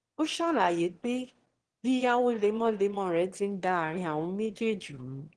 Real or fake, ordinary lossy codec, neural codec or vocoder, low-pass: fake; Opus, 16 kbps; autoencoder, 22.05 kHz, a latent of 192 numbers a frame, VITS, trained on one speaker; 9.9 kHz